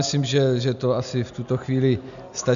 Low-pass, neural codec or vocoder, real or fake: 7.2 kHz; none; real